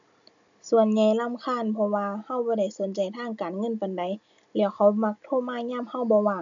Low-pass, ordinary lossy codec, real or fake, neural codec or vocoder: 7.2 kHz; MP3, 96 kbps; real; none